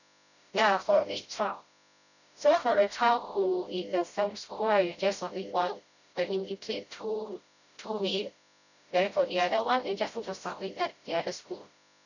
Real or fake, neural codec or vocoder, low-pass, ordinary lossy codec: fake; codec, 16 kHz, 0.5 kbps, FreqCodec, smaller model; 7.2 kHz; none